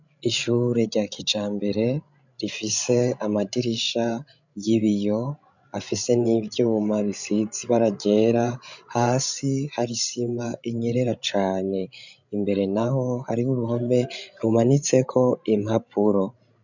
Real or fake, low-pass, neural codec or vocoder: fake; 7.2 kHz; codec, 16 kHz, 16 kbps, FreqCodec, larger model